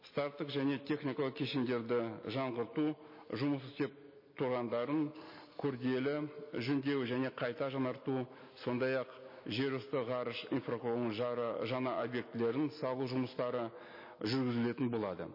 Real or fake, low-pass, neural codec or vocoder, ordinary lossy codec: real; 5.4 kHz; none; MP3, 24 kbps